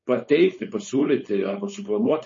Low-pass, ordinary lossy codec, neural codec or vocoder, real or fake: 7.2 kHz; MP3, 32 kbps; codec, 16 kHz, 4.8 kbps, FACodec; fake